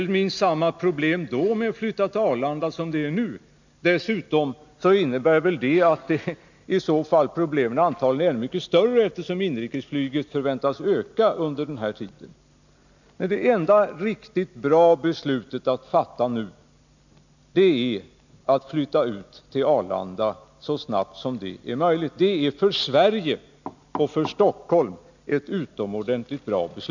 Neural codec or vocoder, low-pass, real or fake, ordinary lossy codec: none; 7.2 kHz; real; none